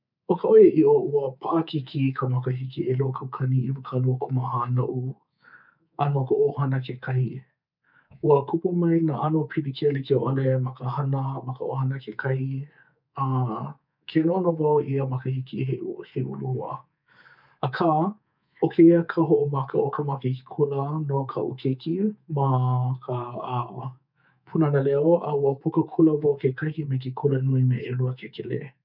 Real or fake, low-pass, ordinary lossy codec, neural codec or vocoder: fake; 5.4 kHz; none; codec, 24 kHz, 3.1 kbps, DualCodec